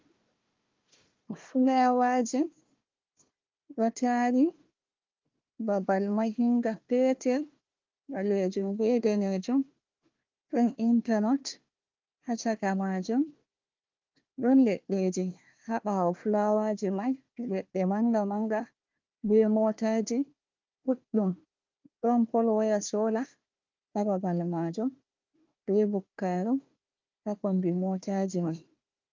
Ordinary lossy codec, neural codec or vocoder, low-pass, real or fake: Opus, 32 kbps; codec, 16 kHz, 1 kbps, FunCodec, trained on Chinese and English, 50 frames a second; 7.2 kHz; fake